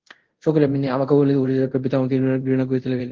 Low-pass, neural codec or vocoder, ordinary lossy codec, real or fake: 7.2 kHz; codec, 24 kHz, 0.5 kbps, DualCodec; Opus, 16 kbps; fake